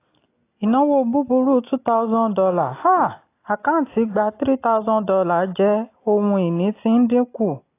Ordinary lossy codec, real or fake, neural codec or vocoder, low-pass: AAC, 24 kbps; real; none; 3.6 kHz